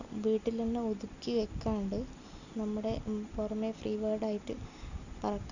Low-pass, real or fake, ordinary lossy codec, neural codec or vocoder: 7.2 kHz; real; none; none